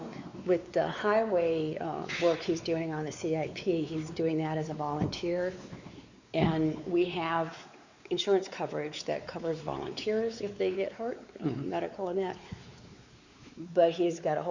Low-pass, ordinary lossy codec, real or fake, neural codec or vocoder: 7.2 kHz; Opus, 64 kbps; fake; codec, 16 kHz, 4 kbps, X-Codec, WavLM features, trained on Multilingual LibriSpeech